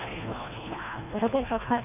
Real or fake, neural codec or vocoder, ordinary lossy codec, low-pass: fake; codec, 24 kHz, 1.5 kbps, HILCodec; none; 3.6 kHz